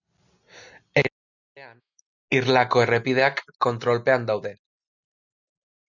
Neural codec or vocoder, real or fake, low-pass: none; real; 7.2 kHz